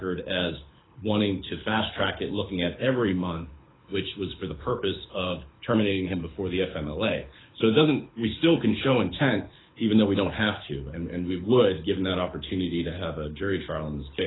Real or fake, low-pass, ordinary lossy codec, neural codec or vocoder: fake; 7.2 kHz; AAC, 16 kbps; codec, 24 kHz, 6 kbps, HILCodec